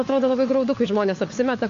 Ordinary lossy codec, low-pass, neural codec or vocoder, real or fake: AAC, 48 kbps; 7.2 kHz; codec, 16 kHz, 4 kbps, FunCodec, trained on LibriTTS, 50 frames a second; fake